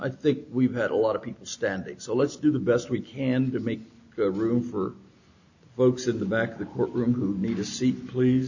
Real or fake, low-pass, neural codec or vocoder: real; 7.2 kHz; none